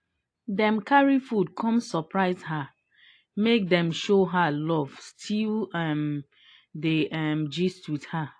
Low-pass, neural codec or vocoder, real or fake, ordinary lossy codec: 9.9 kHz; none; real; AAC, 48 kbps